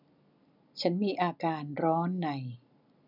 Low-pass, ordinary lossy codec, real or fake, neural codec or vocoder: 5.4 kHz; none; real; none